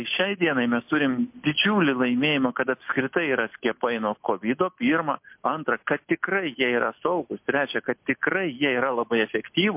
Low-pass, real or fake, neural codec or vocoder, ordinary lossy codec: 3.6 kHz; real; none; MP3, 32 kbps